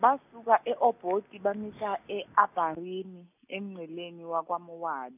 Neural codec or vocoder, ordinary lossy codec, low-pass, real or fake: none; none; 3.6 kHz; real